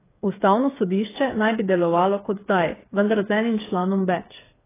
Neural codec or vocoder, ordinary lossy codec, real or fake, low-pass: codec, 16 kHz, 8 kbps, FreqCodec, smaller model; AAC, 16 kbps; fake; 3.6 kHz